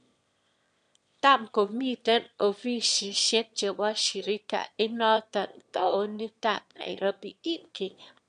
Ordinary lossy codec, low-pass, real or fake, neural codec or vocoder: MP3, 64 kbps; 9.9 kHz; fake; autoencoder, 22.05 kHz, a latent of 192 numbers a frame, VITS, trained on one speaker